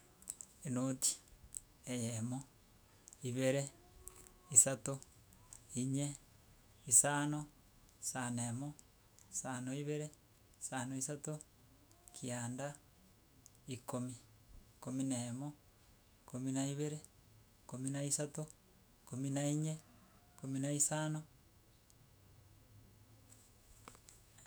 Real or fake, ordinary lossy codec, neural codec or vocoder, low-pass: fake; none; autoencoder, 48 kHz, 128 numbers a frame, DAC-VAE, trained on Japanese speech; none